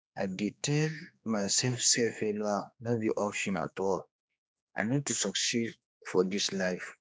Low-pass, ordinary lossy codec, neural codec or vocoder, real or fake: none; none; codec, 16 kHz, 2 kbps, X-Codec, HuBERT features, trained on general audio; fake